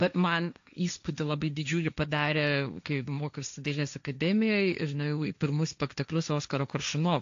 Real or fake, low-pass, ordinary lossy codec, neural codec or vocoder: fake; 7.2 kHz; AAC, 64 kbps; codec, 16 kHz, 1.1 kbps, Voila-Tokenizer